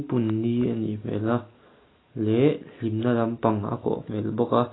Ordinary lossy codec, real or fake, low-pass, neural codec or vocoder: AAC, 16 kbps; real; 7.2 kHz; none